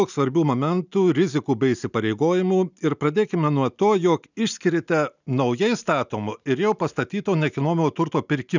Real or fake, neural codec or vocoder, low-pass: real; none; 7.2 kHz